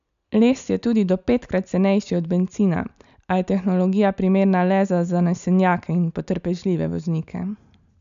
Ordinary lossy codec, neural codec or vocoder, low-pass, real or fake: none; none; 7.2 kHz; real